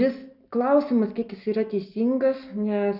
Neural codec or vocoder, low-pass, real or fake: none; 5.4 kHz; real